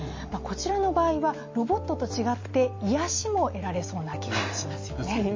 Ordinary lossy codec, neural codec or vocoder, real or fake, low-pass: MP3, 32 kbps; none; real; 7.2 kHz